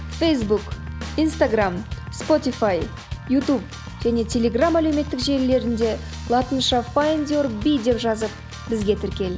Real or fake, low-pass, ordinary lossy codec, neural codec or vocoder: real; none; none; none